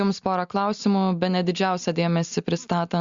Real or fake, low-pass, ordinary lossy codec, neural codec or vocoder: real; 7.2 kHz; Opus, 64 kbps; none